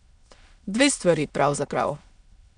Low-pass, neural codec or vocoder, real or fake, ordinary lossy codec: 9.9 kHz; autoencoder, 22.05 kHz, a latent of 192 numbers a frame, VITS, trained on many speakers; fake; none